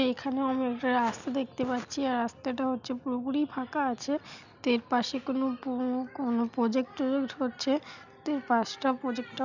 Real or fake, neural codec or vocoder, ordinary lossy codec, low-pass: real; none; none; 7.2 kHz